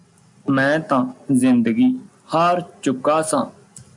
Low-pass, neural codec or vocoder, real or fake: 10.8 kHz; none; real